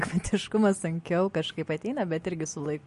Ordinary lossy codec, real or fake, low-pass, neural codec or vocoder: MP3, 48 kbps; real; 14.4 kHz; none